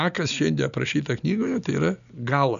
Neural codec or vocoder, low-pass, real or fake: none; 7.2 kHz; real